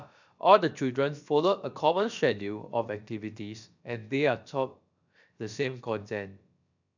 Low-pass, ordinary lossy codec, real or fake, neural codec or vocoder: 7.2 kHz; none; fake; codec, 16 kHz, about 1 kbps, DyCAST, with the encoder's durations